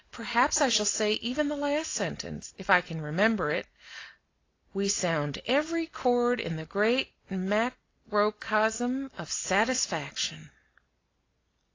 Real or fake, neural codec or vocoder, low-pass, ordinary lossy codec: real; none; 7.2 kHz; AAC, 32 kbps